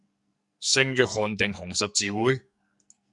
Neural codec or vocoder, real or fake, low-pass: codec, 44.1 kHz, 2.6 kbps, SNAC; fake; 10.8 kHz